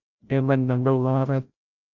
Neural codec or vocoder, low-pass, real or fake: codec, 16 kHz, 0.5 kbps, FreqCodec, larger model; 7.2 kHz; fake